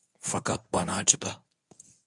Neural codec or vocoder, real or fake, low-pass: codec, 24 kHz, 0.9 kbps, WavTokenizer, medium speech release version 1; fake; 10.8 kHz